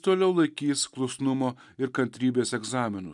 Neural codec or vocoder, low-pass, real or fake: none; 10.8 kHz; real